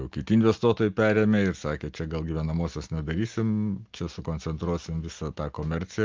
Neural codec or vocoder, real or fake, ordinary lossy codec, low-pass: none; real; Opus, 24 kbps; 7.2 kHz